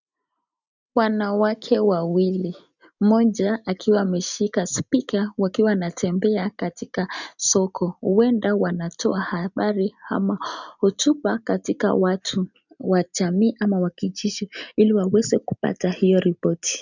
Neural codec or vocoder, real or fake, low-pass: none; real; 7.2 kHz